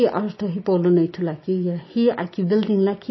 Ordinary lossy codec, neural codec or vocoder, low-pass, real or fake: MP3, 24 kbps; none; 7.2 kHz; real